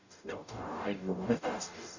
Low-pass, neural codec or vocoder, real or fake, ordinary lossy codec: 7.2 kHz; codec, 44.1 kHz, 0.9 kbps, DAC; fake; none